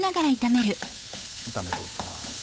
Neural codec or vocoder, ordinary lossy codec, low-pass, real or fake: codec, 16 kHz, 8 kbps, FunCodec, trained on Chinese and English, 25 frames a second; none; none; fake